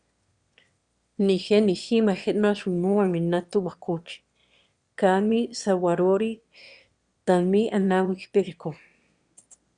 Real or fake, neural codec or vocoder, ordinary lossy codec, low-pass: fake; autoencoder, 22.05 kHz, a latent of 192 numbers a frame, VITS, trained on one speaker; Opus, 64 kbps; 9.9 kHz